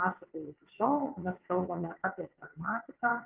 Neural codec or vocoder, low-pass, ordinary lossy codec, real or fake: vocoder, 22.05 kHz, 80 mel bands, WaveNeXt; 3.6 kHz; Opus, 32 kbps; fake